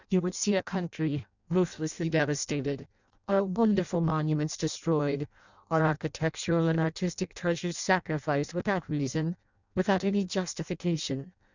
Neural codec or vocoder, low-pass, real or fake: codec, 16 kHz in and 24 kHz out, 0.6 kbps, FireRedTTS-2 codec; 7.2 kHz; fake